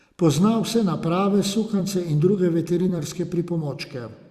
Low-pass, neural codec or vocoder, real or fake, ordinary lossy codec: 14.4 kHz; vocoder, 44.1 kHz, 128 mel bands every 256 samples, BigVGAN v2; fake; Opus, 64 kbps